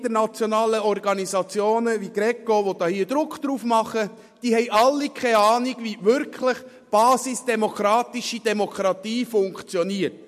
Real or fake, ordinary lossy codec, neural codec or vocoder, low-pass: real; MP3, 64 kbps; none; 14.4 kHz